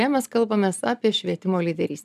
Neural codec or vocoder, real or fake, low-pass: none; real; 14.4 kHz